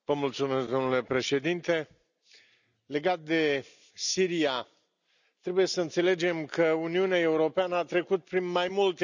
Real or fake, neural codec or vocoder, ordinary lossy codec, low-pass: real; none; none; 7.2 kHz